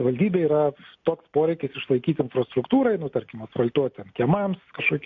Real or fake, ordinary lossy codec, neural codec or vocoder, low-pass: real; MP3, 48 kbps; none; 7.2 kHz